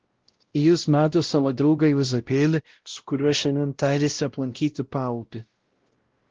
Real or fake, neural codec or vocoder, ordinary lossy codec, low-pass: fake; codec, 16 kHz, 0.5 kbps, X-Codec, WavLM features, trained on Multilingual LibriSpeech; Opus, 16 kbps; 7.2 kHz